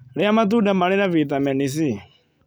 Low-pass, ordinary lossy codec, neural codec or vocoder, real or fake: none; none; none; real